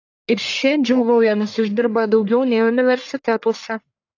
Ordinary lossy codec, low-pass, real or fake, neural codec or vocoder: AAC, 48 kbps; 7.2 kHz; fake; codec, 44.1 kHz, 1.7 kbps, Pupu-Codec